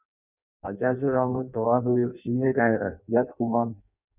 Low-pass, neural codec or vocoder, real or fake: 3.6 kHz; codec, 16 kHz in and 24 kHz out, 0.6 kbps, FireRedTTS-2 codec; fake